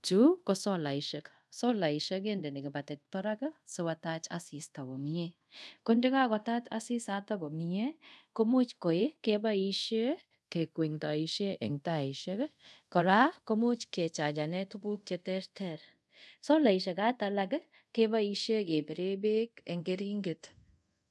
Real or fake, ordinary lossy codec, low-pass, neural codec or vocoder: fake; none; none; codec, 24 kHz, 0.5 kbps, DualCodec